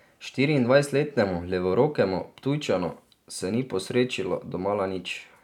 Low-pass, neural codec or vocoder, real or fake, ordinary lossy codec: 19.8 kHz; none; real; none